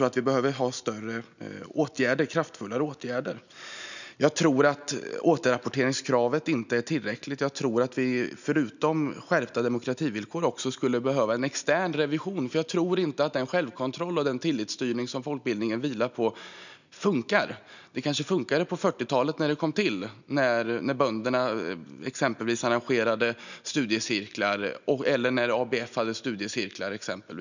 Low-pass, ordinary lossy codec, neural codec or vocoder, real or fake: 7.2 kHz; none; none; real